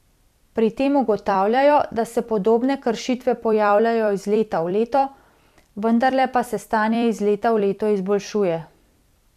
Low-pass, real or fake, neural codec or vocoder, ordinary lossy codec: 14.4 kHz; fake; vocoder, 44.1 kHz, 128 mel bands every 256 samples, BigVGAN v2; none